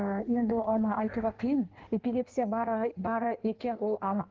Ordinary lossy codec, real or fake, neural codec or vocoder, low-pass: Opus, 16 kbps; fake; codec, 16 kHz in and 24 kHz out, 1.1 kbps, FireRedTTS-2 codec; 7.2 kHz